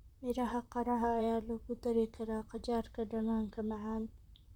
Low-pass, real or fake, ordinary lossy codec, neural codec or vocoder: 19.8 kHz; fake; none; vocoder, 44.1 kHz, 128 mel bands, Pupu-Vocoder